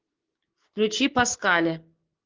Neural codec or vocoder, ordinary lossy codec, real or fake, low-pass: codec, 16 kHz, 6 kbps, DAC; Opus, 16 kbps; fake; 7.2 kHz